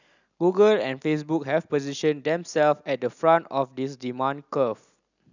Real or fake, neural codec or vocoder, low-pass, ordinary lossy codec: real; none; 7.2 kHz; none